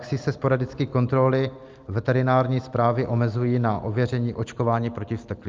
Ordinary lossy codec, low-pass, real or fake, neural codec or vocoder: Opus, 32 kbps; 7.2 kHz; real; none